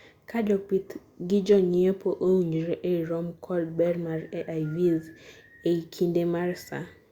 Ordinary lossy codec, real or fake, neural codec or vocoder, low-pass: Opus, 64 kbps; real; none; 19.8 kHz